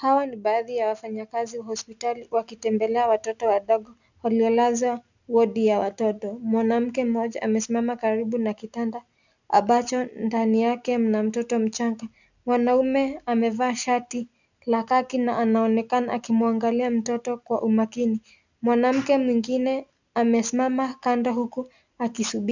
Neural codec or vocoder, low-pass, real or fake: none; 7.2 kHz; real